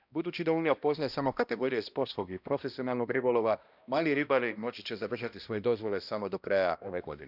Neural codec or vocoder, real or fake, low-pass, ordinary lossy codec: codec, 16 kHz, 1 kbps, X-Codec, HuBERT features, trained on balanced general audio; fake; 5.4 kHz; none